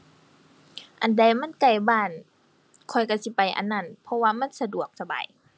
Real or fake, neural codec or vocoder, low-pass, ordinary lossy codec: real; none; none; none